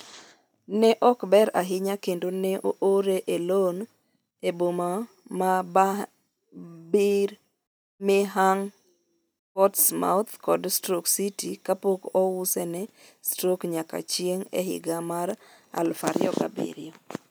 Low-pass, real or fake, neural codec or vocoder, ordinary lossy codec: none; real; none; none